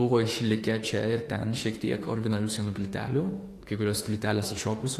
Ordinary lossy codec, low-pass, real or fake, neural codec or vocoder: AAC, 48 kbps; 14.4 kHz; fake; autoencoder, 48 kHz, 32 numbers a frame, DAC-VAE, trained on Japanese speech